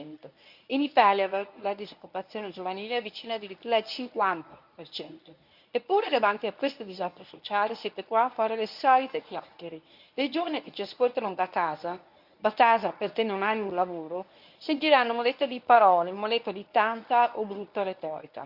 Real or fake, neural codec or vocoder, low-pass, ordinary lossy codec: fake; codec, 24 kHz, 0.9 kbps, WavTokenizer, medium speech release version 1; 5.4 kHz; Opus, 64 kbps